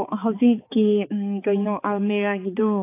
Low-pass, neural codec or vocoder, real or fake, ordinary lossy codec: 3.6 kHz; codec, 16 kHz, 4 kbps, X-Codec, HuBERT features, trained on balanced general audio; fake; AAC, 24 kbps